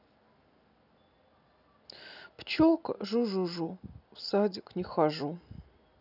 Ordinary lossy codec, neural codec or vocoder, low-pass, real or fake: none; none; 5.4 kHz; real